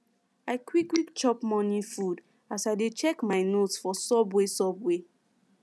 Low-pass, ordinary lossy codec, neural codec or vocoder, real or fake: none; none; none; real